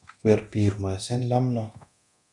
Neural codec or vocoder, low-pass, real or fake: codec, 24 kHz, 0.9 kbps, DualCodec; 10.8 kHz; fake